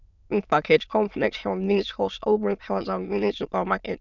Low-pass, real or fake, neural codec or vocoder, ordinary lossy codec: 7.2 kHz; fake; autoencoder, 22.05 kHz, a latent of 192 numbers a frame, VITS, trained on many speakers; none